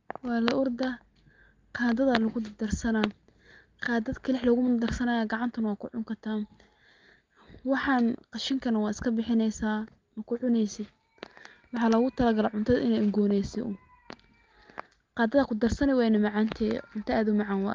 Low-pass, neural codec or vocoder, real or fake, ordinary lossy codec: 7.2 kHz; none; real; Opus, 32 kbps